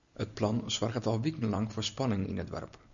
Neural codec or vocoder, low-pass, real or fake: none; 7.2 kHz; real